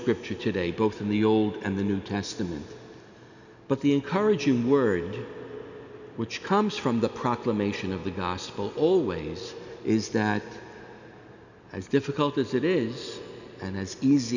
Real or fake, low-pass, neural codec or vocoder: real; 7.2 kHz; none